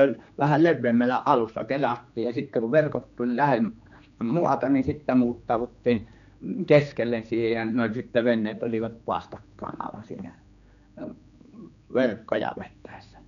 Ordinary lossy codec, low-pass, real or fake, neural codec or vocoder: none; 7.2 kHz; fake; codec, 16 kHz, 2 kbps, X-Codec, HuBERT features, trained on general audio